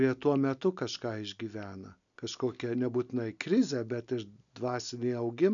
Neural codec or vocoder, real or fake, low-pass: none; real; 7.2 kHz